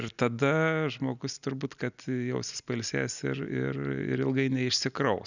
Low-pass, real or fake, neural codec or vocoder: 7.2 kHz; real; none